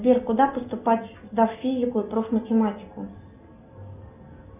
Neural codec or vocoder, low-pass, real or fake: none; 3.6 kHz; real